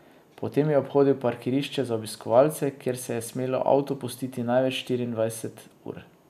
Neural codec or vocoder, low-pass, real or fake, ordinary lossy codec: none; 14.4 kHz; real; none